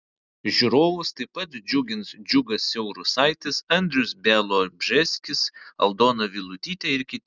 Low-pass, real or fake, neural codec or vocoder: 7.2 kHz; real; none